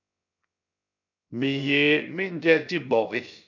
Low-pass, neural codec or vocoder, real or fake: 7.2 kHz; codec, 16 kHz, 0.7 kbps, FocalCodec; fake